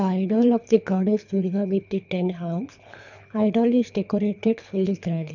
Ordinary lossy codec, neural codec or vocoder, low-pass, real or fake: none; codec, 24 kHz, 3 kbps, HILCodec; 7.2 kHz; fake